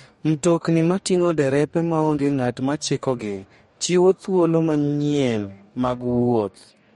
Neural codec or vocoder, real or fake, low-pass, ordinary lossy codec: codec, 44.1 kHz, 2.6 kbps, DAC; fake; 19.8 kHz; MP3, 48 kbps